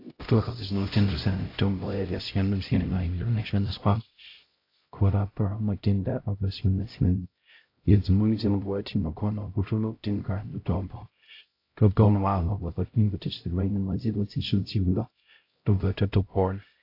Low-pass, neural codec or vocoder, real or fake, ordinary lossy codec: 5.4 kHz; codec, 16 kHz, 0.5 kbps, X-Codec, HuBERT features, trained on LibriSpeech; fake; AAC, 32 kbps